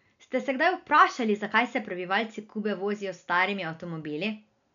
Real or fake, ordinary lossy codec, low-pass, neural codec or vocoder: real; none; 7.2 kHz; none